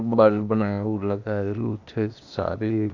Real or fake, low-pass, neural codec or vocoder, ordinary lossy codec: fake; 7.2 kHz; codec, 16 kHz, 0.8 kbps, ZipCodec; none